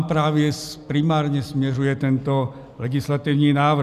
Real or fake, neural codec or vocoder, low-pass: real; none; 14.4 kHz